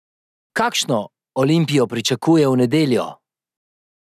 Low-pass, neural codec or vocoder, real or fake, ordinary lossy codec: 14.4 kHz; none; real; none